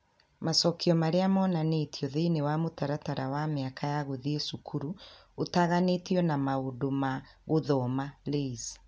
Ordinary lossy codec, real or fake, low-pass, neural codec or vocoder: none; real; none; none